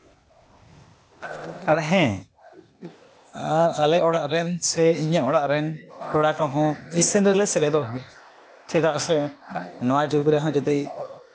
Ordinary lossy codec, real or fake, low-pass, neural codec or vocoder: none; fake; none; codec, 16 kHz, 0.8 kbps, ZipCodec